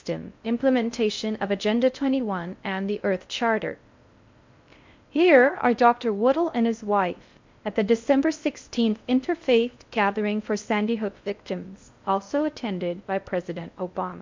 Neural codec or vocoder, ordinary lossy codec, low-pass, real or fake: codec, 16 kHz in and 24 kHz out, 0.6 kbps, FocalCodec, streaming, 4096 codes; MP3, 64 kbps; 7.2 kHz; fake